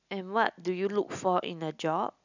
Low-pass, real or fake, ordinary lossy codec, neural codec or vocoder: 7.2 kHz; real; none; none